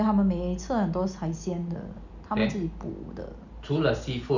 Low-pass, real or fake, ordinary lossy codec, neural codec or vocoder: 7.2 kHz; real; none; none